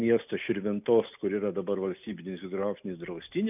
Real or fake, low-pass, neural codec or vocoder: real; 3.6 kHz; none